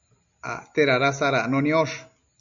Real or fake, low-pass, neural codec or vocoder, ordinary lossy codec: real; 7.2 kHz; none; MP3, 64 kbps